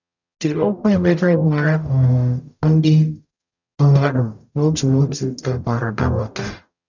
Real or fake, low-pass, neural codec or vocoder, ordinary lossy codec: fake; 7.2 kHz; codec, 44.1 kHz, 0.9 kbps, DAC; none